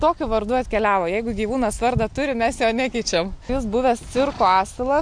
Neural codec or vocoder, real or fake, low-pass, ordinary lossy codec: none; real; 9.9 kHz; MP3, 64 kbps